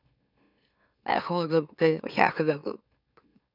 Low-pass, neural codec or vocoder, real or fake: 5.4 kHz; autoencoder, 44.1 kHz, a latent of 192 numbers a frame, MeloTTS; fake